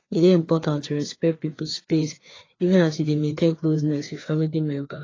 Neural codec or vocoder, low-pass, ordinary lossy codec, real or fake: codec, 16 kHz, 2 kbps, FreqCodec, larger model; 7.2 kHz; AAC, 32 kbps; fake